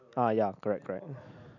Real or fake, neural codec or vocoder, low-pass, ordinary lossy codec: real; none; 7.2 kHz; none